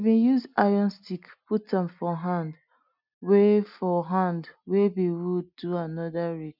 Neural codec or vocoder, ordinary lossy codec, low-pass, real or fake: none; none; 5.4 kHz; real